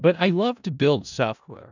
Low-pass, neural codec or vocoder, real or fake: 7.2 kHz; codec, 16 kHz in and 24 kHz out, 0.4 kbps, LongCat-Audio-Codec, four codebook decoder; fake